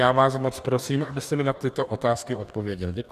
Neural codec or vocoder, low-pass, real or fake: codec, 44.1 kHz, 2.6 kbps, DAC; 14.4 kHz; fake